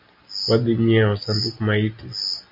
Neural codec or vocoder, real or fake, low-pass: none; real; 5.4 kHz